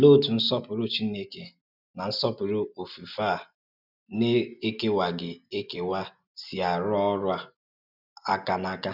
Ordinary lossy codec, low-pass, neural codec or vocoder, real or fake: none; 5.4 kHz; none; real